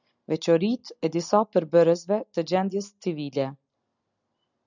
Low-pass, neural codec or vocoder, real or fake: 7.2 kHz; none; real